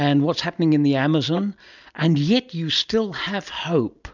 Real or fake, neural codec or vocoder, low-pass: real; none; 7.2 kHz